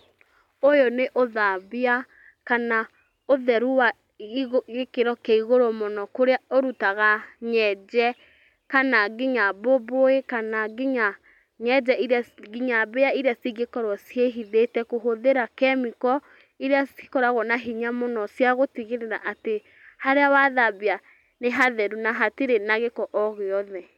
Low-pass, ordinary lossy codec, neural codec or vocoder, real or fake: 19.8 kHz; none; none; real